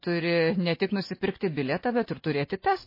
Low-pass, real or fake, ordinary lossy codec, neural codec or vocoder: 5.4 kHz; real; MP3, 24 kbps; none